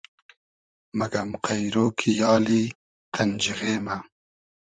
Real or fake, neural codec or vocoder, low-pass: fake; vocoder, 44.1 kHz, 128 mel bands, Pupu-Vocoder; 9.9 kHz